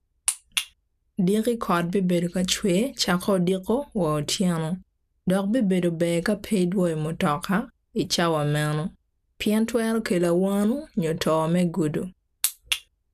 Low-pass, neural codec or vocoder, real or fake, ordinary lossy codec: 14.4 kHz; none; real; none